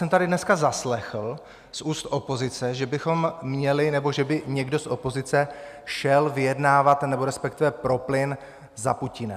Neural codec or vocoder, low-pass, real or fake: none; 14.4 kHz; real